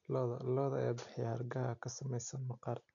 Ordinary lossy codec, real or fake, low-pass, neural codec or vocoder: none; real; 7.2 kHz; none